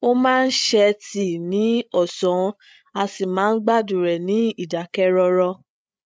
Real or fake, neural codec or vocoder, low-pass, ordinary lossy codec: fake; codec, 16 kHz, 8 kbps, FreqCodec, larger model; none; none